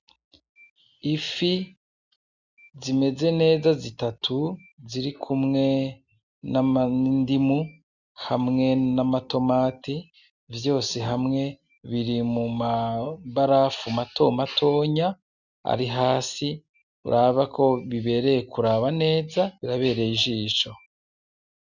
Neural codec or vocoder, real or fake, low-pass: none; real; 7.2 kHz